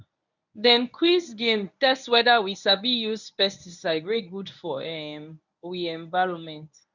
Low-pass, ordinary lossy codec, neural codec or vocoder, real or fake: 7.2 kHz; none; codec, 24 kHz, 0.9 kbps, WavTokenizer, medium speech release version 1; fake